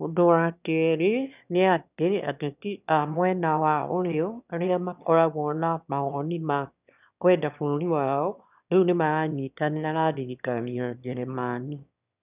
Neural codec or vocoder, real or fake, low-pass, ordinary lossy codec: autoencoder, 22.05 kHz, a latent of 192 numbers a frame, VITS, trained on one speaker; fake; 3.6 kHz; none